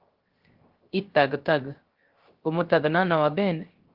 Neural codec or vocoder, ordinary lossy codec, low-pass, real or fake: codec, 16 kHz, 0.3 kbps, FocalCodec; Opus, 16 kbps; 5.4 kHz; fake